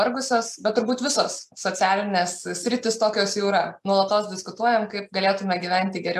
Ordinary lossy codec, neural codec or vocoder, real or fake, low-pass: AAC, 96 kbps; none; real; 14.4 kHz